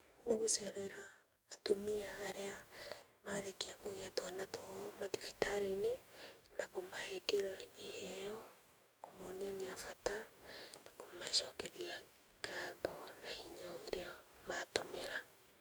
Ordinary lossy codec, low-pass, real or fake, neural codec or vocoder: none; none; fake; codec, 44.1 kHz, 2.6 kbps, DAC